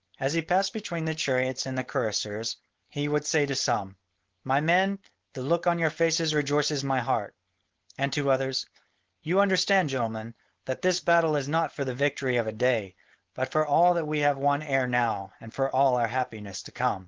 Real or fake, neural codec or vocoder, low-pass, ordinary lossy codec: fake; codec, 16 kHz, 4.8 kbps, FACodec; 7.2 kHz; Opus, 16 kbps